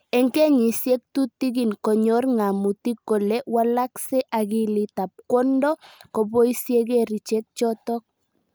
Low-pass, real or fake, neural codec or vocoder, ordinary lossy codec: none; real; none; none